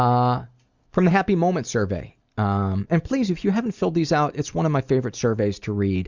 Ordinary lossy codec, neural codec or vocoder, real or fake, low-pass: Opus, 64 kbps; none; real; 7.2 kHz